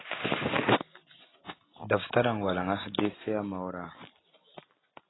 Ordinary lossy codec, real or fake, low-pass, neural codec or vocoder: AAC, 16 kbps; real; 7.2 kHz; none